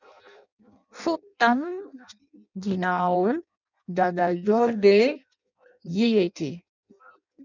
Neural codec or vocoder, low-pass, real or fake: codec, 16 kHz in and 24 kHz out, 0.6 kbps, FireRedTTS-2 codec; 7.2 kHz; fake